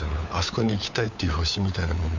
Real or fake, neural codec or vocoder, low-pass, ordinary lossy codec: fake; codec, 16 kHz, 4 kbps, FunCodec, trained on LibriTTS, 50 frames a second; 7.2 kHz; none